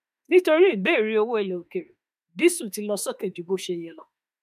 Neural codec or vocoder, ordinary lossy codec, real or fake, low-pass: autoencoder, 48 kHz, 32 numbers a frame, DAC-VAE, trained on Japanese speech; none; fake; 14.4 kHz